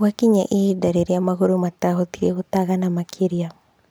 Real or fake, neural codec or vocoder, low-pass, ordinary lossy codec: real; none; none; none